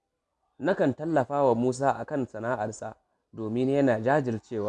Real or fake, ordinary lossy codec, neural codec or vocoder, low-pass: real; none; none; none